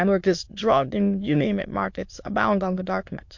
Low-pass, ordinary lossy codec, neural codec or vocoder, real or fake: 7.2 kHz; MP3, 48 kbps; autoencoder, 22.05 kHz, a latent of 192 numbers a frame, VITS, trained on many speakers; fake